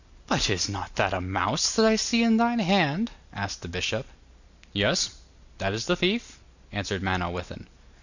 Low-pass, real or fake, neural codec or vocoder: 7.2 kHz; real; none